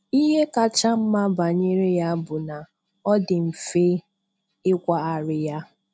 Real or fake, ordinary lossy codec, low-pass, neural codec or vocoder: real; none; none; none